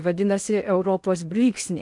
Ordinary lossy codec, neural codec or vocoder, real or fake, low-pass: MP3, 96 kbps; codec, 16 kHz in and 24 kHz out, 0.8 kbps, FocalCodec, streaming, 65536 codes; fake; 10.8 kHz